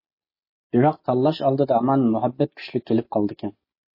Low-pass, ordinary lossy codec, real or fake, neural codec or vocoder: 5.4 kHz; MP3, 24 kbps; real; none